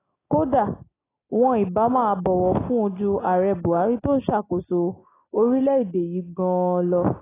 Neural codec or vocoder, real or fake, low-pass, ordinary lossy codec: none; real; 3.6 kHz; AAC, 16 kbps